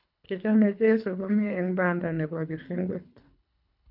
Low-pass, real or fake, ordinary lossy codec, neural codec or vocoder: 5.4 kHz; fake; none; codec, 24 kHz, 3 kbps, HILCodec